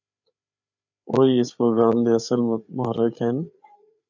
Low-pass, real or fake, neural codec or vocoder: 7.2 kHz; fake; codec, 16 kHz, 8 kbps, FreqCodec, larger model